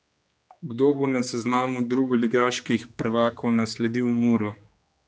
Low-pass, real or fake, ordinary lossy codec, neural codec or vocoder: none; fake; none; codec, 16 kHz, 2 kbps, X-Codec, HuBERT features, trained on general audio